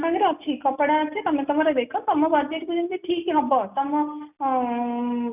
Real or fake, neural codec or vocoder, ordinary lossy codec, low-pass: real; none; none; 3.6 kHz